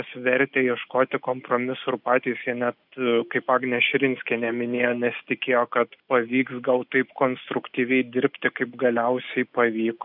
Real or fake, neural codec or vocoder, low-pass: real; none; 5.4 kHz